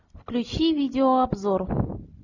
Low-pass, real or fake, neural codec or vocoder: 7.2 kHz; real; none